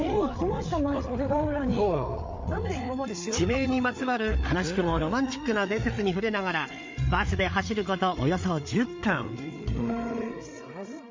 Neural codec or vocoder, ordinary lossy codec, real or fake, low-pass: codec, 16 kHz, 8 kbps, FreqCodec, larger model; MP3, 48 kbps; fake; 7.2 kHz